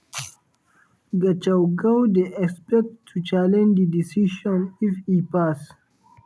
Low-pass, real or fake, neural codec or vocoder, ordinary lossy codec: none; real; none; none